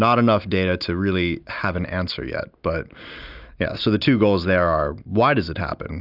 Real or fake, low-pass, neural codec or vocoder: real; 5.4 kHz; none